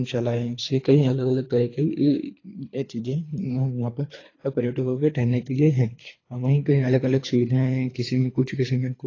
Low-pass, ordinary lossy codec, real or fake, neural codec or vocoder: 7.2 kHz; AAC, 32 kbps; fake; codec, 24 kHz, 3 kbps, HILCodec